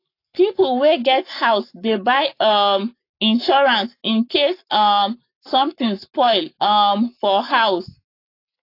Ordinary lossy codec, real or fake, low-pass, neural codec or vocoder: AAC, 32 kbps; fake; 5.4 kHz; codec, 44.1 kHz, 7.8 kbps, Pupu-Codec